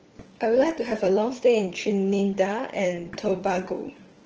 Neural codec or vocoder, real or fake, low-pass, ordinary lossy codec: codec, 16 kHz, 4 kbps, FunCodec, trained on LibriTTS, 50 frames a second; fake; 7.2 kHz; Opus, 16 kbps